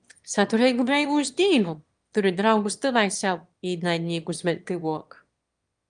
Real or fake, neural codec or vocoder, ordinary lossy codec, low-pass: fake; autoencoder, 22.05 kHz, a latent of 192 numbers a frame, VITS, trained on one speaker; Opus, 32 kbps; 9.9 kHz